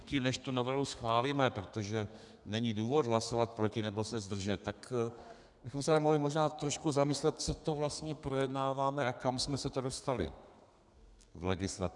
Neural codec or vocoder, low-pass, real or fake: codec, 32 kHz, 1.9 kbps, SNAC; 10.8 kHz; fake